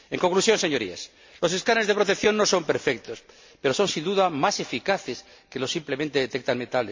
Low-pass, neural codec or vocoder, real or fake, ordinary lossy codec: 7.2 kHz; none; real; MP3, 48 kbps